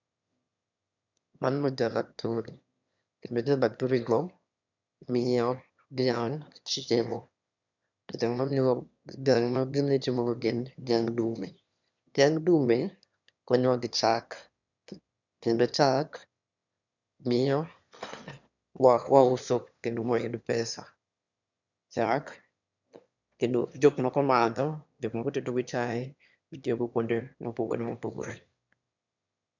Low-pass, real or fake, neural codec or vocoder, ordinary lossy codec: 7.2 kHz; fake; autoencoder, 22.05 kHz, a latent of 192 numbers a frame, VITS, trained on one speaker; none